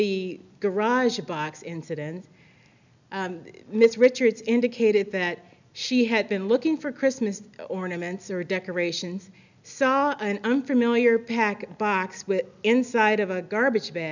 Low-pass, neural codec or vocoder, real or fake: 7.2 kHz; none; real